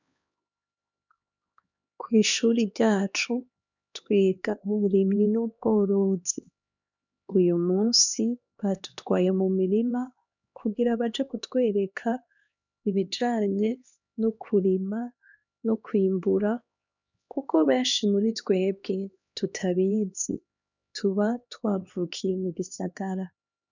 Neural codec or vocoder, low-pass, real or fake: codec, 16 kHz, 2 kbps, X-Codec, HuBERT features, trained on LibriSpeech; 7.2 kHz; fake